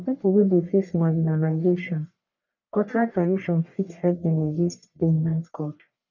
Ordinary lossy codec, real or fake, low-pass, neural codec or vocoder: none; fake; 7.2 kHz; codec, 44.1 kHz, 1.7 kbps, Pupu-Codec